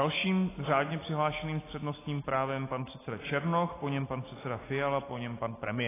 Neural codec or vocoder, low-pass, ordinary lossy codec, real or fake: none; 3.6 kHz; AAC, 16 kbps; real